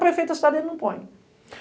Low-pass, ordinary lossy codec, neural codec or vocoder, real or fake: none; none; none; real